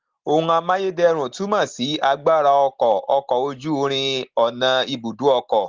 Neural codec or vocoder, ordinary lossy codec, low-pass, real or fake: none; Opus, 16 kbps; 7.2 kHz; real